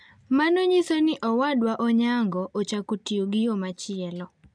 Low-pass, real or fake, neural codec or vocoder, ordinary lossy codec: 9.9 kHz; real; none; none